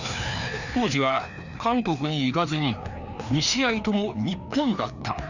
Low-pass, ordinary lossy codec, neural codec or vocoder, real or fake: 7.2 kHz; none; codec, 16 kHz, 2 kbps, FreqCodec, larger model; fake